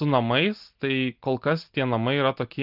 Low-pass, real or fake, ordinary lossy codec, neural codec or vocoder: 5.4 kHz; real; Opus, 32 kbps; none